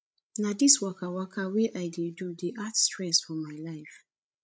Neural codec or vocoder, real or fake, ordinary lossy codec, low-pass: codec, 16 kHz, 16 kbps, FreqCodec, larger model; fake; none; none